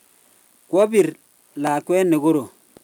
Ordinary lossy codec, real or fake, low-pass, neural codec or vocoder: none; real; 19.8 kHz; none